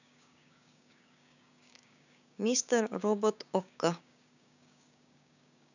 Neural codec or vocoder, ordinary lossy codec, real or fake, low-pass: codec, 16 kHz, 6 kbps, DAC; none; fake; 7.2 kHz